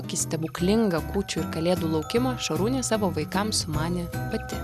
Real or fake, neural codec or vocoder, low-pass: real; none; 14.4 kHz